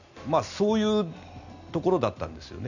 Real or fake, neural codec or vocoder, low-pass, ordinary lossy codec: real; none; 7.2 kHz; none